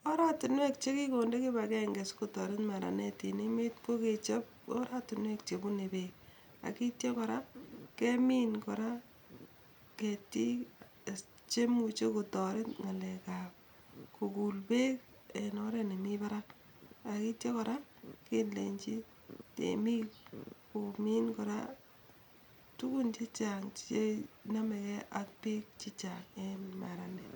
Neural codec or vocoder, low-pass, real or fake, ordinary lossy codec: none; 19.8 kHz; real; none